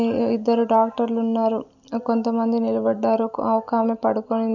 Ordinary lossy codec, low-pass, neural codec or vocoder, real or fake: none; 7.2 kHz; none; real